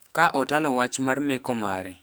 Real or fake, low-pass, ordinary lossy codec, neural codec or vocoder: fake; none; none; codec, 44.1 kHz, 2.6 kbps, SNAC